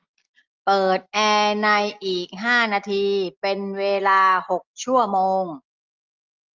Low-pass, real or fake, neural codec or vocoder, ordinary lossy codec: 7.2 kHz; real; none; Opus, 24 kbps